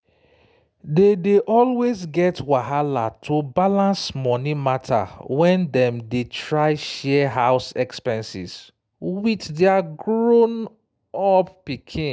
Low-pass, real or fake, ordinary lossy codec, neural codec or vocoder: none; real; none; none